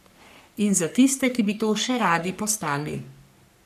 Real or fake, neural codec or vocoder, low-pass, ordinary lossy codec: fake; codec, 44.1 kHz, 3.4 kbps, Pupu-Codec; 14.4 kHz; none